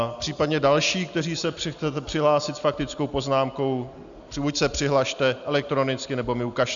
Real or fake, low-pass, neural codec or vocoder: real; 7.2 kHz; none